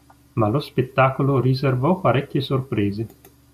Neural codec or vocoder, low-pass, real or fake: none; 14.4 kHz; real